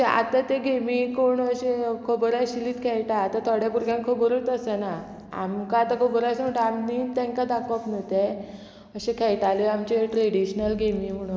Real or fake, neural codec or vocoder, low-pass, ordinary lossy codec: real; none; none; none